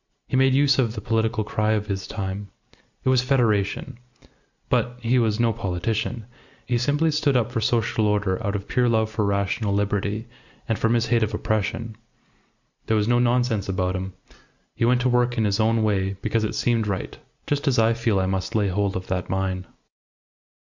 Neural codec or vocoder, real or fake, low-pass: none; real; 7.2 kHz